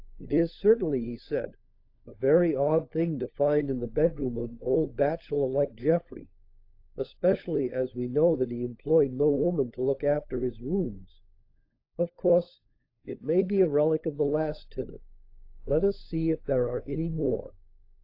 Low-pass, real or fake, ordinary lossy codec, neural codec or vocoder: 5.4 kHz; fake; AAC, 32 kbps; codec, 16 kHz, 16 kbps, FunCodec, trained on LibriTTS, 50 frames a second